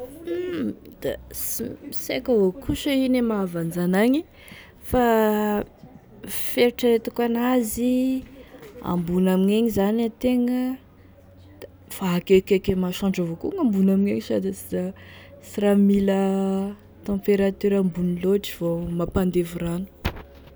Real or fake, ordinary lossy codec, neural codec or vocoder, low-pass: real; none; none; none